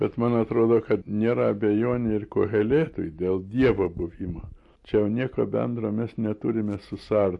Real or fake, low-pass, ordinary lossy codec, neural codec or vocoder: real; 10.8 kHz; MP3, 48 kbps; none